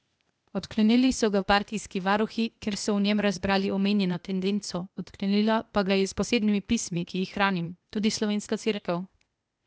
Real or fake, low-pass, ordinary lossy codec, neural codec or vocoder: fake; none; none; codec, 16 kHz, 0.8 kbps, ZipCodec